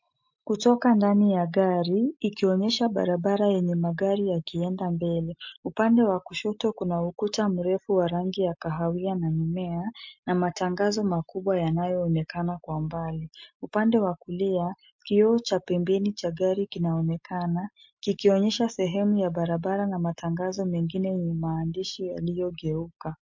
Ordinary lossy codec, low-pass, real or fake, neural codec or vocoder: MP3, 48 kbps; 7.2 kHz; real; none